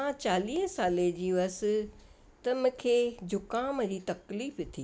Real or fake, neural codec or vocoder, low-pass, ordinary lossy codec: real; none; none; none